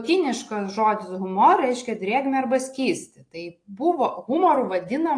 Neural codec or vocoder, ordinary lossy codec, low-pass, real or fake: none; AAC, 48 kbps; 9.9 kHz; real